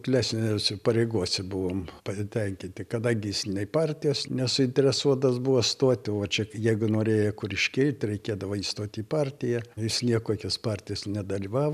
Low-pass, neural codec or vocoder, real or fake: 14.4 kHz; none; real